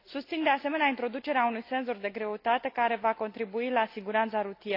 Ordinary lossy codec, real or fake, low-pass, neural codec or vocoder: AAC, 32 kbps; real; 5.4 kHz; none